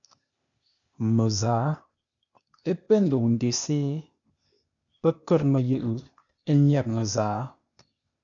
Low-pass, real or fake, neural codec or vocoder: 7.2 kHz; fake; codec, 16 kHz, 0.8 kbps, ZipCodec